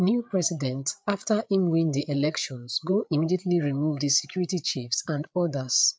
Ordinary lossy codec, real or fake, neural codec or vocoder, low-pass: none; fake; codec, 16 kHz, 8 kbps, FreqCodec, larger model; none